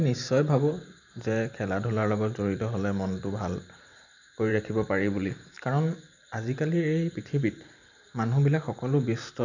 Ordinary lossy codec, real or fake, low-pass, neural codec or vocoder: none; fake; 7.2 kHz; vocoder, 44.1 kHz, 128 mel bands every 512 samples, BigVGAN v2